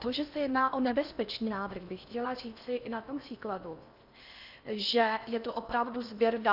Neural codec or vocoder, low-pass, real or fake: codec, 16 kHz in and 24 kHz out, 0.8 kbps, FocalCodec, streaming, 65536 codes; 5.4 kHz; fake